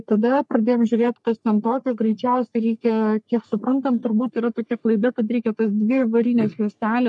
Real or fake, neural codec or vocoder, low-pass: fake; codec, 44.1 kHz, 3.4 kbps, Pupu-Codec; 10.8 kHz